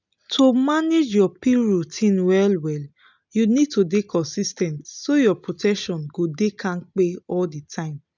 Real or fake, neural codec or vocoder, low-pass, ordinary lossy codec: real; none; 7.2 kHz; none